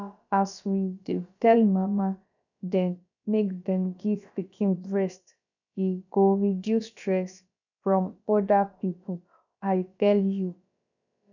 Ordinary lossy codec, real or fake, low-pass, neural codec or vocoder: none; fake; 7.2 kHz; codec, 16 kHz, about 1 kbps, DyCAST, with the encoder's durations